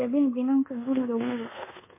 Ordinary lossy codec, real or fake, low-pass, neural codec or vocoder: MP3, 16 kbps; fake; 3.6 kHz; codec, 24 kHz, 1.2 kbps, DualCodec